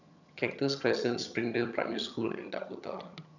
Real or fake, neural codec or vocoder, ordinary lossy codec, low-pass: fake; vocoder, 22.05 kHz, 80 mel bands, HiFi-GAN; none; 7.2 kHz